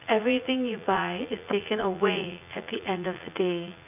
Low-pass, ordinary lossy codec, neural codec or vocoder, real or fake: 3.6 kHz; none; vocoder, 44.1 kHz, 80 mel bands, Vocos; fake